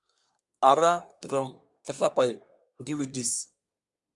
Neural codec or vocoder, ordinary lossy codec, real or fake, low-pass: codec, 24 kHz, 1 kbps, SNAC; Opus, 64 kbps; fake; 10.8 kHz